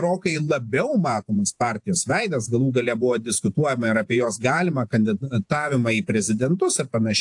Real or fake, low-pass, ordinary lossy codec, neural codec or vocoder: fake; 10.8 kHz; AAC, 64 kbps; vocoder, 48 kHz, 128 mel bands, Vocos